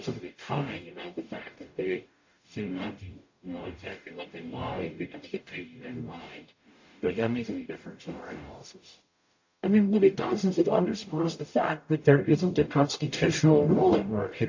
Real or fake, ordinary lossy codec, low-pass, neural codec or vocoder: fake; AAC, 48 kbps; 7.2 kHz; codec, 44.1 kHz, 0.9 kbps, DAC